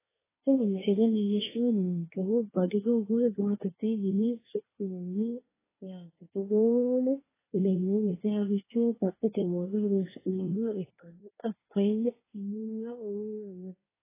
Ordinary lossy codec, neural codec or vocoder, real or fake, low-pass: AAC, 16 kbps; codec, 24 kHz, 1 kbps, SNAC; fake; 3.6 kHz